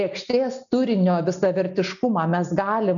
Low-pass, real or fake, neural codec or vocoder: 7.2 kHz; real; none